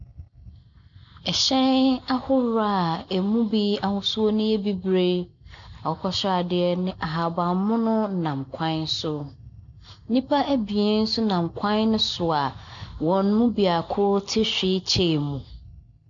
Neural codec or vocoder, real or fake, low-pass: none; real; 7.2 kHz